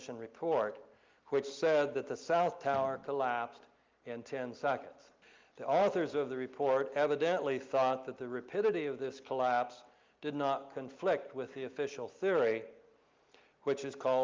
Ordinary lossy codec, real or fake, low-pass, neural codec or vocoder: Opus, 32 kbps; real; 7.2 kHz; none